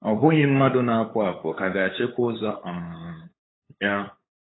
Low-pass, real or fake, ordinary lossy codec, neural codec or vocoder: 7.2 kHz; fake; AAC, 16 kbps; codec, 16 kHz, 8 kbps, FunCodec, trained on LibriTTS, 25 frames a second